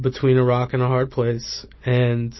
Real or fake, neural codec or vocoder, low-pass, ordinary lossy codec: real; none; 7.2 kHz; MP3, 24 kbps